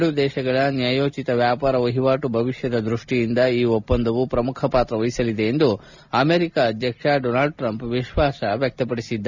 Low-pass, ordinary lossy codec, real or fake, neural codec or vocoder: 7.2 kHz; none; real; none